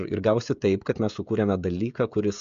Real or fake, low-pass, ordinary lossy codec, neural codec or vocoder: fake; 7.2 kHz; MP3, 64 kbps; codec, 16 kHz, 16 kbps, FreqCodec, smaller model